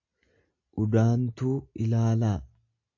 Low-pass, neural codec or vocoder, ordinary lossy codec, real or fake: 7.2 kHz; none; AAC, 48 kbps; real